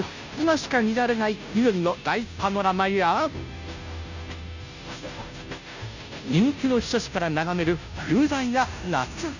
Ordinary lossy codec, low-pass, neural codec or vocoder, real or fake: none; 7.2 kHz; codec, 16 kHz, 0.5 kbps, FunCodec, trained on Chinese and English, 25 frames a second; fake